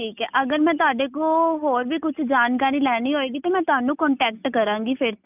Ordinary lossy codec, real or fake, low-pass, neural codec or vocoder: none; real; 3.6 kHz; none